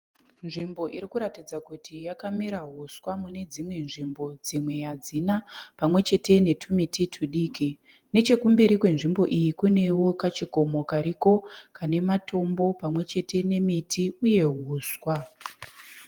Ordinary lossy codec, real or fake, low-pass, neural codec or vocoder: Opus, 24 kbps; fake; 19.8 kHz; vocoder, 48 kHz, 128 mel bands, Vocos